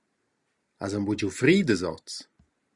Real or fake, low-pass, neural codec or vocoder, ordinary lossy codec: real; 10.8 kHz; none; Opus, 64 kbps